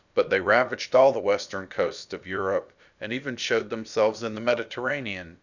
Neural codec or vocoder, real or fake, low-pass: codec, 16 kHz, about 1 kbps, DyCAST, with the encoder's durations; fake; 7.2 kHz